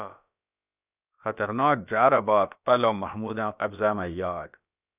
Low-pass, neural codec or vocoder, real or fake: 3.6 kHz; codec, 16 kHz, about 1 kbps, DyCAST, with the encoder's durations; fake